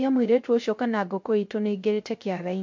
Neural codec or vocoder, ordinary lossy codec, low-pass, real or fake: codec, 16 kHz, 0.3 kbps, FocalCodec; MP3, 48 kbps; 7.2 kHz; fake